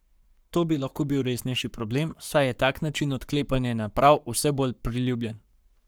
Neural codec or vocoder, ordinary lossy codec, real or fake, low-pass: codec, 44.1 kHz, 7.8 kbps, Pupu-Codec; none; fake; none